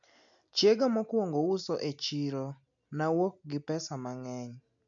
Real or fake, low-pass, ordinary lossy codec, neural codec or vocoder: real; 7.2 kHz; AAC, 64 kbps; none